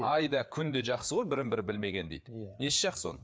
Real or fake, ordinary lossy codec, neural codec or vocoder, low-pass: fake; none; codec, 16 kHz, 4 kbps, FreqCodec, larger model; none